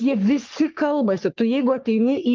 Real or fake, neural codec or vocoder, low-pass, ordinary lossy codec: fake; codec, 44.1 kHz, 3.4 kbps, Pupu-Codec; 7.2 kHz; Opus, 24 kbps